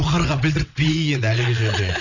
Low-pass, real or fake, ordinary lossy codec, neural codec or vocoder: 7.2 kHz; fake; none; vocoder, 22.05 kHz, 80 mel bands, WaveNeXt